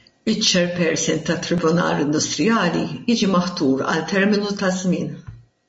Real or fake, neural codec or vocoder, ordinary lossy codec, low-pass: real; none; MP3, 32 kbps; 9.9 kHz